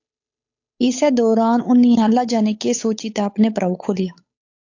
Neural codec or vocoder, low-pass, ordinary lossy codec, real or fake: codec, 16 kHz, 8 kbps, FunCodec, trained on Chinese and English, 25 frames a second; 7.2 kHz; AAC, 48 kbps; fake